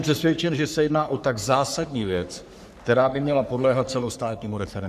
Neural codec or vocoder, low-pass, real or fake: codec, 44.1 kHz, 3.4 kbps, Pupu-Codec; 14.4 kHz; fake